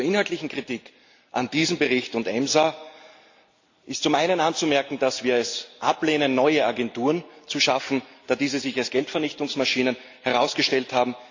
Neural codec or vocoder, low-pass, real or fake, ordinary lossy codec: none; 7.2 kHz; real; AAC, 48 kbps